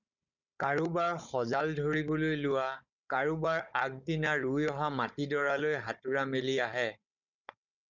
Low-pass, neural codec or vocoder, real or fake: 7.2 kHz; codec, 16 kHz, 16 kbps, FunCodec, trained on Chinese and English, 50 frames a second; fake